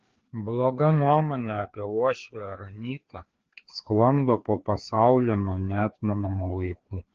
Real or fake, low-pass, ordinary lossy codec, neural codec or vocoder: fake; 7.2 kHz; Opus, 16 kbps; codec, 16 kHz, 2 kbps, FreqCodec, larger model